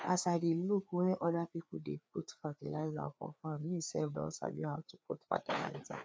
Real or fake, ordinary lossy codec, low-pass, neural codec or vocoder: fake; none; none; codec, 16 kHz, 4 kbps, FreqCodec, larger model